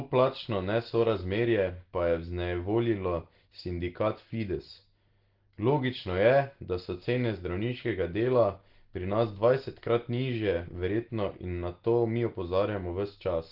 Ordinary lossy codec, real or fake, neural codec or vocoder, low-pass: Opus, 16 kbps; real; none; 5.4 kHz